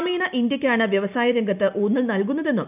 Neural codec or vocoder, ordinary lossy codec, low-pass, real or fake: none; none; 3.6 kHz; real